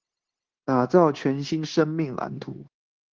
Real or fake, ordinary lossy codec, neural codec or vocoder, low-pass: fake; Opus, 16 kbps; codec, 16 kHz, 0.9 kbps, LongCat-Audio-Codec; 7.2 kHz